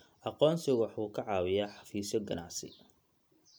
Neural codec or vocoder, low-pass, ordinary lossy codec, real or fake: none; none; none; real